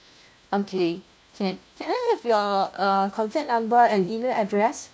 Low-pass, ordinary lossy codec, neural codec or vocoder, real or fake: none; none; codec, 16 kHz, 1 kbps, FunCodec, trained on LibriTTS, 50 frames a second; fake